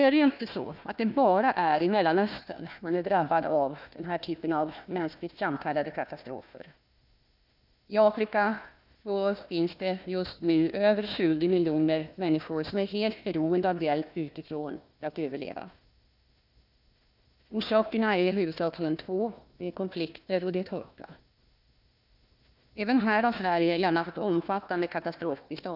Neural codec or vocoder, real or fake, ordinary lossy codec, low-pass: codec, 16 kHz, 1 kbps, FunCodec, trained on Chinese and English, 50 frames a second; fake; none; 5.4 kHz